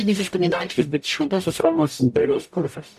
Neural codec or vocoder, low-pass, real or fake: codec, 44.1 kHz, 0.9 kbps, DAC; 14.4 kHz; fake